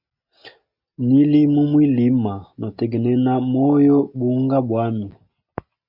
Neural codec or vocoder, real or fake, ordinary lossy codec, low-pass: none; real; MP3, 48 kbps; 5.4 kHz